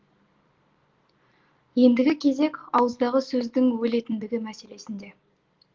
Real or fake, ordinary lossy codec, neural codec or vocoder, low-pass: real; Opus, 16 kbps; none; 7.2 kHz